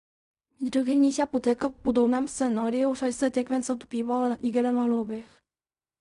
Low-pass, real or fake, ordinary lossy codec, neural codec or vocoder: 10.8 kHz; fake; none; codec, 16 kHz in and 24 kHz out, 0.4 kbps, LongCat-Audio-Codec, fine tuned four codebook decoder